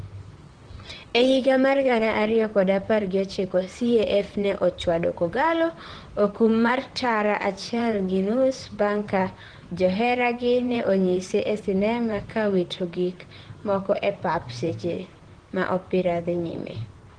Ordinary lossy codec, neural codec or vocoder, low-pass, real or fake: Opus, 16 kbps; vocoder, 44.1 kHz, 128 mel bands, Pupu-Vocoder; 9.9 kHz; fake